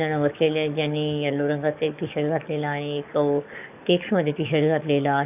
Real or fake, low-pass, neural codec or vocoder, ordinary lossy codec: fake; 3.6 kHz; codec, 44.1 kHz, 7.8 kbps, DAC; none